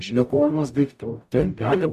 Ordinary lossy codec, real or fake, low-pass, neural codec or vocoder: AAC, 96 kbps; fake; 14.4 kHz; codec, 44.1 kHz, 0.9 kbps, DAC